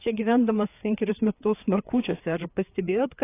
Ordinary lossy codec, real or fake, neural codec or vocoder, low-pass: AAC, 24 kbps; fake; codec, 16 kHz, 4 kbps, FreqCodec, larger model; 3.6 kHz